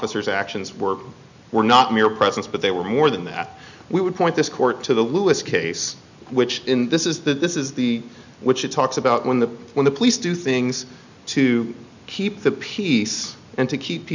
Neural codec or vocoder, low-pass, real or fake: none; 7.2 kHz; real